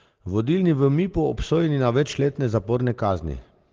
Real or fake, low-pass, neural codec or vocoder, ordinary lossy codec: real; 7.2 kHz; none; Opus, 16 kbps